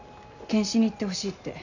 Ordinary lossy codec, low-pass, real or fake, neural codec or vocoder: none; 7.2 kHz; real; none